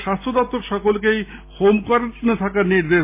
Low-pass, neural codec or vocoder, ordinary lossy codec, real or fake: 3.6 kHz; none; MP3, 24 kbps; real